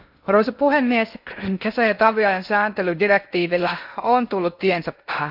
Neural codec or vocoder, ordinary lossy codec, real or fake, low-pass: codec, 16 kHz in and 24 kHz out, 0.6 kbps, FocalCodec, streaming, 2048 codes; AAC, 48 kbps; fake; 5.4 kHz